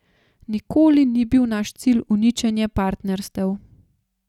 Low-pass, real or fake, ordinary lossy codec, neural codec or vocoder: 19.8 kHz; real; none; none